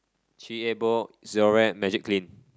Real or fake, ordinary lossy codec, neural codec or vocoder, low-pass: real; none; none; none